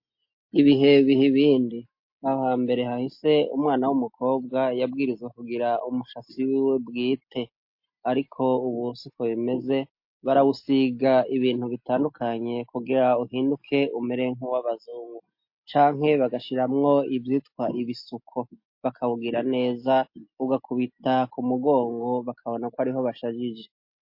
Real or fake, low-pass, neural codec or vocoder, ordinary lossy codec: real; 5.4 kHz; none; MP3, 32 kbps